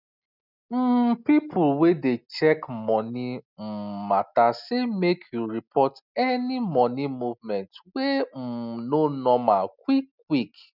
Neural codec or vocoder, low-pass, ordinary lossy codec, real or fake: none; 5.4 kHz; none; real